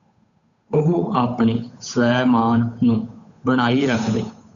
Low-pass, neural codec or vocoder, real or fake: 7.2 kHz; codec, 16 kHz, 8 kbps, FunCodec, trained on Chinese and English, 25 frames a second; fake